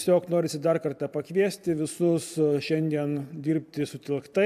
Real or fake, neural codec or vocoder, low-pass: fake; vocoder, 44.1 kHz, 128 mel bands every 512 samples, BigVGAN v2; 14.4 kHz